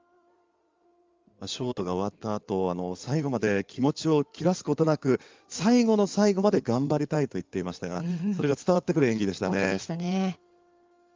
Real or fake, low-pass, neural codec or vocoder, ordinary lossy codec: fake; 7.2 kHz; codec, 16 kHz in and 24 kHz out, 2.2 kbps, FireRedTTS-2 codec; Opus, 32 kbps